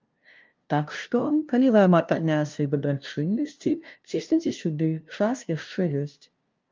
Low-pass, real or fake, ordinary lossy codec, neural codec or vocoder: 7.2 kHz; fake; Opus, 24 kbps; codec, 16 kHz, 0.5 kbps, FunCodec, trained on LibriTTS, 25 frames a second